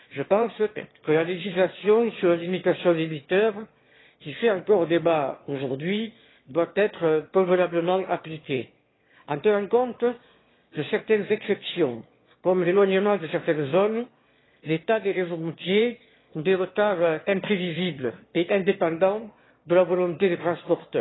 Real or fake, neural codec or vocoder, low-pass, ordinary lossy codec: fake; autoencoder, 22.05 kHz, a latent of 192 numbers a frame, VITS, trained on one speaker; 7.2 kHz; AAC, 16 kbps